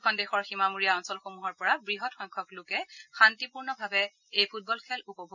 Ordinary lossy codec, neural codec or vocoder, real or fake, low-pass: none; none; real; 7.2 kHz